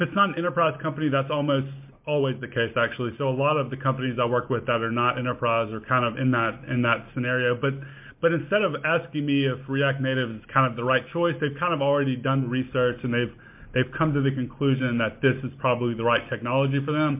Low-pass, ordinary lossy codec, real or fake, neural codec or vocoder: 3.6 kHz; MP3, 32 kbps; real; none